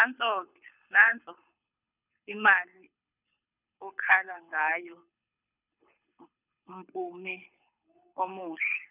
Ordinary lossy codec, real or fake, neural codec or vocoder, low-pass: none; fake; codec, 24 kHz, 6 kbps, HILCodec; 3.6 kHz